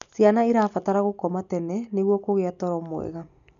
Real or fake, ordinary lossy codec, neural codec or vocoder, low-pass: real; none; none; 7.2 kHz